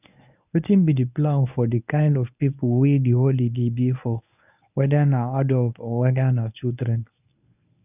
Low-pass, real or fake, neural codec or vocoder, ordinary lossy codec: 3.6 kHz; fake; codec, 24 kHz, 0.9 kbps, WavTokenizer, medium speech release version 1; none